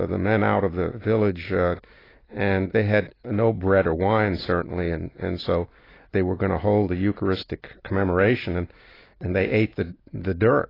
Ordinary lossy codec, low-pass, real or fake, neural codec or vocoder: AAC, 24 kbps; 5.4 kHz; real; none